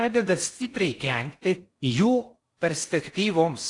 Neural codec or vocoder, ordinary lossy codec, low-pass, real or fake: codec, 16 kHz in and 24 kHz out, 0.6 kbps, FocalCodec, streaming, 4096 codes; AAC, 48 kbps; 10.8 kHz; fake